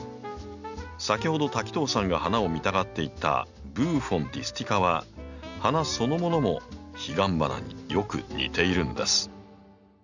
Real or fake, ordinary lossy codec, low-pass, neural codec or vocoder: real; none; 7.2 kHz; none